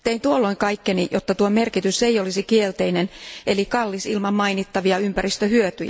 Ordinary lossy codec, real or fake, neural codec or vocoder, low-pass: none; real; none; none